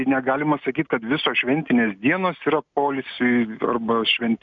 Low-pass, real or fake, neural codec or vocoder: 7.2 kHz; real; none